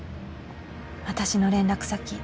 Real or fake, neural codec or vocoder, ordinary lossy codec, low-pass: real; none; none; none